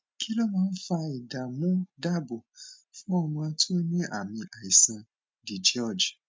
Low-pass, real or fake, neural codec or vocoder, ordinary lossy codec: none; real; none; none